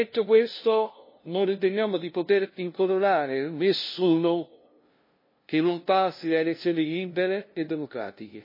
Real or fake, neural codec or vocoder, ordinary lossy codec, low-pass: fake; codec, 16 kHz, 0.5 kbps, FunCodec, trained on LibriTTS, 25 frames a second; MP3, 24 kbps; 5.4 kHz